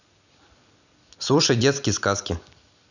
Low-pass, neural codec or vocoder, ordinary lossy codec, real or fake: 7.2 kHz; none; none; real